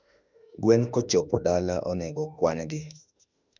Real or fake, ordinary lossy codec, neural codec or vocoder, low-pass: fake; none; autoencoder, 48 kHz, 32 numbers a frame, DAC-VAE, trained on Japanese speech; 7.2 kHz